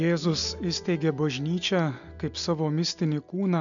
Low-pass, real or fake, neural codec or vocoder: 7.2 kHz; real; none